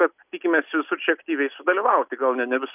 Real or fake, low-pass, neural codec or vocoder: real; 3.6 kHz; none